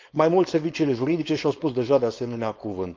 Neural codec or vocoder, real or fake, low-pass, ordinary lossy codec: codec, 16 kHz, 4.8 kbps, FACodec; fake; 7.2 kHz; Opus, 32 kbps